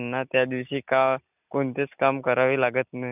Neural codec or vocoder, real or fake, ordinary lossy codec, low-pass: none; real; none; 3.6 kHz